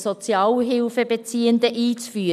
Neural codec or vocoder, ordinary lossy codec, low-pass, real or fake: none; none; 14.4 kHz; real